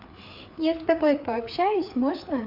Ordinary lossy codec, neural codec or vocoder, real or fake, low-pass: MP3, 48 kbps; codec, 16 kHz, 4 kbps, FreqCodec, larger model; fake; 5.4 kHz